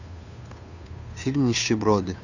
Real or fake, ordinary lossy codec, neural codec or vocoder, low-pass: fake; none; codec, 16 kHz, 2 kbps, FunCodec, trained on Chinese and English, 25 frames a second; 7.2 kHz